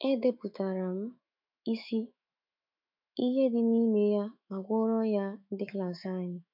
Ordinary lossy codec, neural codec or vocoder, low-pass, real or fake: MP3, 48 kbps; autoencoder, 48 kHz, 128 numbers a frame, DAC-VAE, trained on Japanese speech; 5.4 kHz; fake